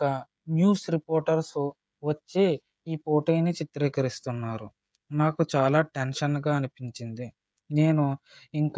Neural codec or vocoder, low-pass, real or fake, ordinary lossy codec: codec, 16 kHz, 16 kbps, FreqCodec, smaller model; none; fake; none